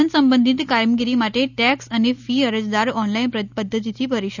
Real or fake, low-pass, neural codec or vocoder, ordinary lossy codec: real; 7.2 kHz; none; none